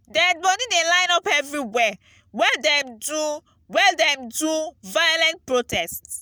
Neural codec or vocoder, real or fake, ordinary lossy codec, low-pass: none; real; none; none